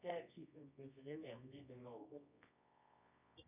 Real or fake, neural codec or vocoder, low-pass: fake; codec, 24 kHz, 0.9 kbps, WavTokenizer, medium music audio release; 3.6 kHz